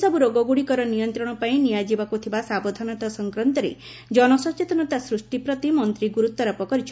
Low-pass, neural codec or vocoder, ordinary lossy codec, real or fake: none; none; none; real